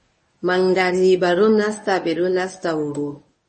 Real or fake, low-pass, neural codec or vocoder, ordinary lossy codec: fake; 10.8 kHz; codec, 24 kHz, 0.9 kbps, WavTokenizer, medium speech release version 1; MP3, 32 kbps